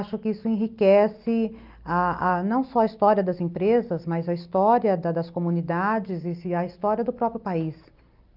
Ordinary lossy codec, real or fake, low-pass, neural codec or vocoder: Opus, 24 kbps; real; 5.4 kHz; none